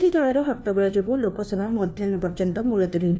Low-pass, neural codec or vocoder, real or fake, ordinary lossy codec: none; codec, 16 kHz, 1 kbps, FunCodec, trained on LibriTTS, 50 frames a second; fake; none